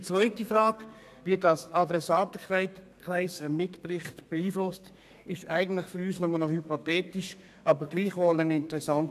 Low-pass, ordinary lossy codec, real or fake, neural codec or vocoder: 14.4 kHz; none; fake; codec, 32 kHz, 1.9 kbps, SNAC